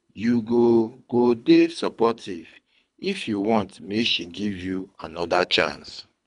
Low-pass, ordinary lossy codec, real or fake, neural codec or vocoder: 10.8 kHz; none; fake; codec, 24 kHz, 3 kbps, HILCodec